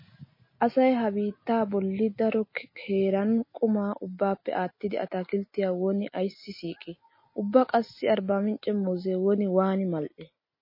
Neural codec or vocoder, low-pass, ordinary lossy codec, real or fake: none; 5.4 kHz; MP3, 24 kbps; real